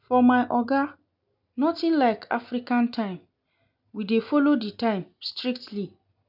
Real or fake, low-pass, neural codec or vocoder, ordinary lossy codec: real; 5.4 kHz; none; none